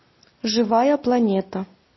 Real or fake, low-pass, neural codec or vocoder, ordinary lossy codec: real; 7.2 kHz; none; MP3, 24 kbps